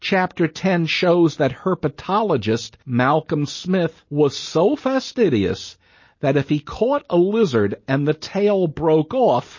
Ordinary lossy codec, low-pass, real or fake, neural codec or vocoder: MP3, 32 kbps; 7.2 kHz; real; none